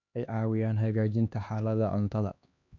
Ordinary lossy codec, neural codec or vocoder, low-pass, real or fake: none; codec, 16 kHz, 2 kbps, X-Codec, HuBERT features, trained on LibriSpeech; 7.2 kHz; fake